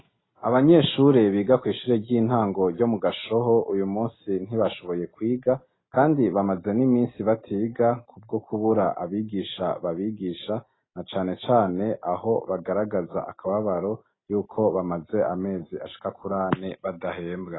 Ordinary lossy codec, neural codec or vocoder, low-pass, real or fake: AAC, 16 kbps; none; 7.2 kHz; real